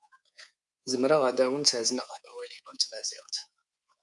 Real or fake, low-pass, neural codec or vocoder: fake; 10.8 kHz; codec, 24 kHz, 3.1 kbps, DualCodec